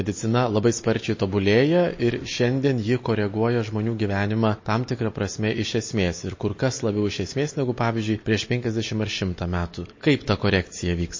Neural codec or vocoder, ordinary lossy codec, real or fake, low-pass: none; MP3, 32 kbps; real; 7.2 kHz